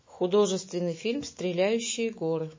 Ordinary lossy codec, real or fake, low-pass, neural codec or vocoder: MP3, 32 kbps; fake; 7.2 kHz; autoencoder, 48 kHz, 128 numbers a frame, DAC-VAE, trained on Japanese speech